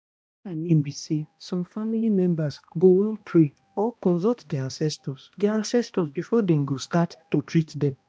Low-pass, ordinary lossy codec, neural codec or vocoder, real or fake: none; none; codec, 16 kHz, 1 kbps, X-Codec, HuBERT features, trained on balanced general audio; fake